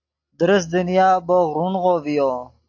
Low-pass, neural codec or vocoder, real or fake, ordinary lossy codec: 7.2 kHz; none; real; AAC, 48 kbps